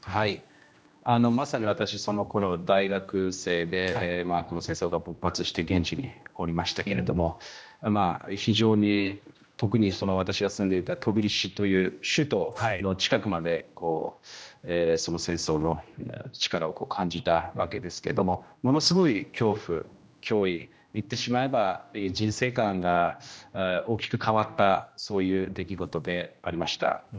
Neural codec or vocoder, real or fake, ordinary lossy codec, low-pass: codec, 16 kHz, 1 kbps, X-Codec, HuBERT features, trained on general audio; fake; none; none